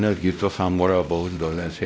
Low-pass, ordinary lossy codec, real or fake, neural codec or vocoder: none; none; fake; codec, 16 kHz, 0.5 kbps, X-Codec, WavLM features, trained on Multilingual LibriSpeech